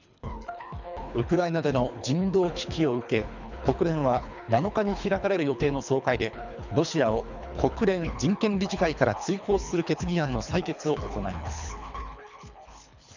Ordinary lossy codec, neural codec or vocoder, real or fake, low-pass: none; codec, 24 kHz, 3 kbps, HILCodec; fake; 7.2 kHz